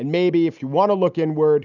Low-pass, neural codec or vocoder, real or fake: 7.2 kHz; none; real